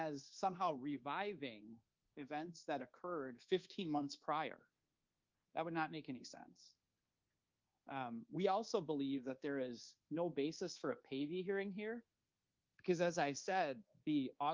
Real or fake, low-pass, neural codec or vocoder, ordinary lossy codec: fake; 7.2 kHz; codec, 24 kHz, 1.2 kbps, DualCodec; Opus, 32 kbps